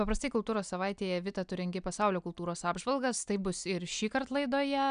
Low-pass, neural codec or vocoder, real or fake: 9.9 kHz; none; real